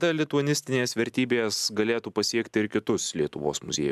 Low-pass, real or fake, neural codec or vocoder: 14.4 kHz; real; none